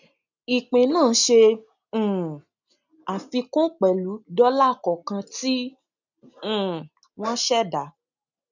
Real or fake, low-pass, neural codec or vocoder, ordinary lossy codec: real; 7.2 kHz; none; none